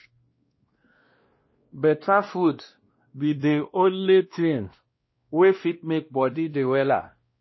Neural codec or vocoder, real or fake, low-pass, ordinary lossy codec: codec, 16 kHz, 1 kbps, X-Codec, WavLM features, trained on Multilingual LibriSpeech; fake; 7.2 kHz; MP3, 24 kbps